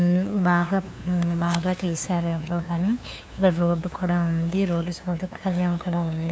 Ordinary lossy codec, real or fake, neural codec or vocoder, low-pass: none; fake; codec, 16 kHz, 2 kbps, FunCodec, trained on LibriTTS, 25 frames a second; none